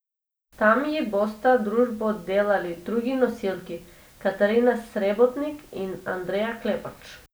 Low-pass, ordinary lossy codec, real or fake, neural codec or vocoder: none; none; real; none